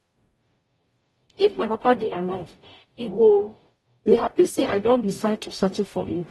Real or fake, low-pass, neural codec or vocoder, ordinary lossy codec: fake; 19.8 kHz; codec, 44.1 kHz, 0.9 kbps, DAC; AAC, 32 kbps